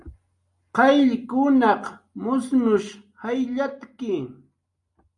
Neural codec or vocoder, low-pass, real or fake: none; 10.8 kHz; real